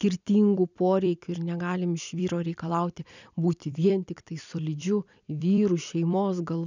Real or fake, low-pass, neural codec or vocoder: fake; 7.2 kHz; vocoder, 44.1 kHz, 128 mel bands every 256 samples, BigVGAN v2